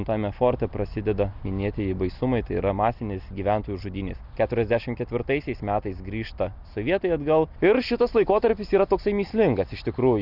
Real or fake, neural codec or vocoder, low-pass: real; none; 5.4 kHz